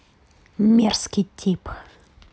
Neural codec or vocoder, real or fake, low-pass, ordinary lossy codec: none; real; none; none